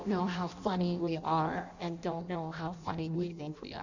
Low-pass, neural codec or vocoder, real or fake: 7.2 kHz; codec, 16 kHz in and 24 kHz out, 0.6 kbps, FireRedTTS-2 codec; fake